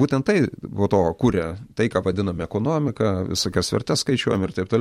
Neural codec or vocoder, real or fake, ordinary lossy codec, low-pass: vocoder, 44.1 kHz, 128 mel bands every 256 samples, BigVGAN v2; fake; MP3, 64 kbps; 19.8 kHz